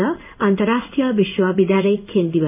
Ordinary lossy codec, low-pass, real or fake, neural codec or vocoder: AAC, 32 kbps; 3.6 kHz; fake; codec, 16 kHz in and 24 kHz out, 1 kbps, XY-Tokenizer